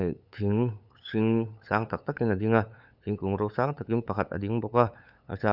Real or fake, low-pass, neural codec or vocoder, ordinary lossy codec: fake; 5.4 kHz; codec, 16 kHz, 8 kbps, FunCodec, trained on LibriTTS, 25 frames a second; none